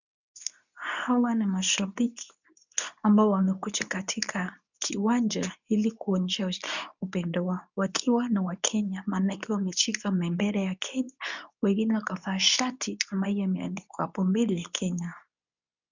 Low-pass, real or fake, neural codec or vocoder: 7.2 kHz; fake; codec, 24 kHz, 0.9 kbps, WavTokenizer, medium speech release version 2